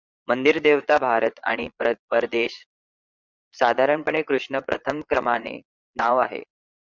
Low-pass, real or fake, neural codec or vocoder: 7.2 kHz; fake; vocoder, 22.05 kHz, 80 mel bands, Vocos